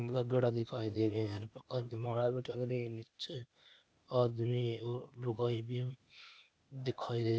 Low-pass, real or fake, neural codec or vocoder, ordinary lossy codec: none; fake; codec, 16 kHz, 0.8 kbps, ZipCodec; none